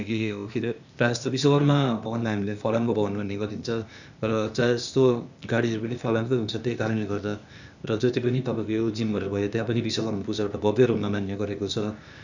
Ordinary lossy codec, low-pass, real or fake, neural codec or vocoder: none; 7.2 kHz; fake; codec, 16 kHz, 0.8 kbps, ZipCodec